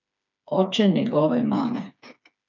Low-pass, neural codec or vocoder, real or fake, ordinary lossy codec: 7.2 kHz; codec, 16 kHz, 4 kbps, FreqCodec, smaller model; fake; none